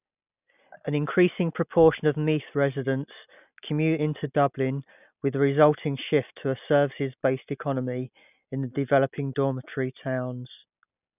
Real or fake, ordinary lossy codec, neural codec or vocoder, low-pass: real; none; none; 3.6 kHz